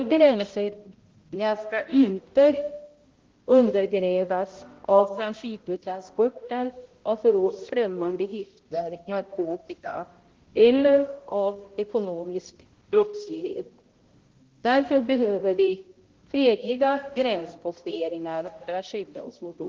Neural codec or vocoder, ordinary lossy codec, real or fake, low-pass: codec, 16 kHz, 0.5 kbps, X-Codec, HuBERT features, trained on balanced general audio; Opus, 16 kbps; fake; 7.2 kHz